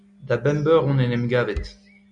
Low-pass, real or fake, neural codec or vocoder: 9.9 kHz; real; none